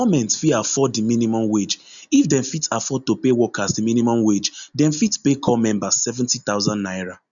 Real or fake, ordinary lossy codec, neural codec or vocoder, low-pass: real; none; none; 7.2 kHz